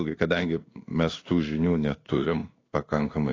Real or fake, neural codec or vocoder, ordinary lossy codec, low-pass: fake; codec, 16 kHz in and 24 kHz out, 1 kbps, XY-Tokenizer; AAC, 32 kbps; 7.2 kHz